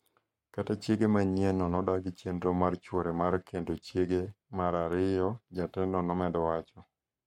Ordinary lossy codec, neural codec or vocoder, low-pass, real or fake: MP3, 64 kbps; codec, 44.1 kHz, 7.8 kbps, Pupu-Codec; 19.8 kHz; fake